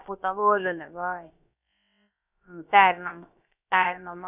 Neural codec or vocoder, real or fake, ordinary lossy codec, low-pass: codec, 16 kHz, about 1 kbps, DyCAST, with the encoder's durations; fake; none; 3.6 kHz